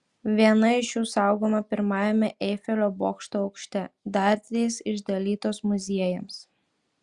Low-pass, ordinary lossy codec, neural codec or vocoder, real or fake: 10.8 kHz; Opus, 64 kbps; none; real